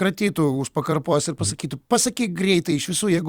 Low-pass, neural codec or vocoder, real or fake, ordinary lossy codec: 19.8 kHz; vocoder, 44.1 kHz, 128 mel bands every 256 samples, BigVGAN v2; fake; Opus, 64 kbps